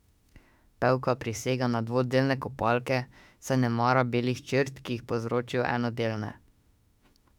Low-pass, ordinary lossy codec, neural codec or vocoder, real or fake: 19.8 kHz; none; autoencoder, 48 kHz, 32 numbers a frame, DAC-VAE, trained on Japanese speech; fake